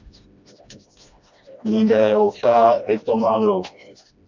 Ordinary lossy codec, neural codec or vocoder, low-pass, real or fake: MP3, 64 kbps; codec, 16 kHz, 1 kbps, FreqCodec, smaller model; 7.2 kHz; fake